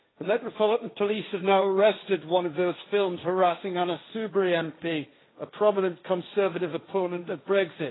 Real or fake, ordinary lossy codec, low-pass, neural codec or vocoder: fake; AAC, 16 kbps; 7.2 kHz; codec, 16 kHz, 1.1 kbps, Voila-Tokenizer